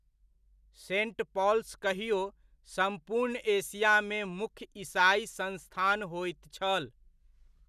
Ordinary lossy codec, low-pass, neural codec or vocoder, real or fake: none; 14.4 kHz; none; real